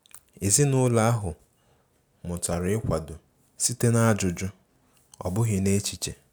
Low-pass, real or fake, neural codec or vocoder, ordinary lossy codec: none; real; none; none